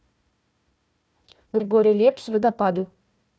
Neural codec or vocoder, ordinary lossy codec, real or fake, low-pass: codec, 16 kHz, 1 kbps, FunCodec, trained on Chinese and English, 50 frames a second; none; fake; none